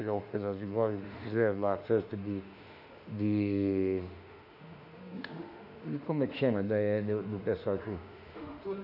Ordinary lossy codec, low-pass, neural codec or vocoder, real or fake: none; 5.4 kHz; autoencoder, 48 kHz, 32 numbers a frame, DAC-VAE, trained on Japanese speech; fake